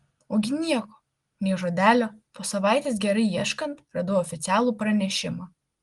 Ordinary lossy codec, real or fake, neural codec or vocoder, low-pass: Opus, 24 kbps; real; none; 10.8 kHz